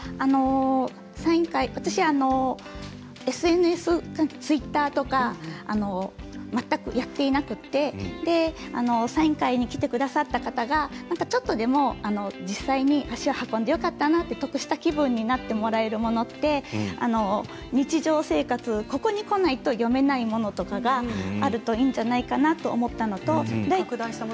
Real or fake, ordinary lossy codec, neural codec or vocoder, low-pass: real; none; none; none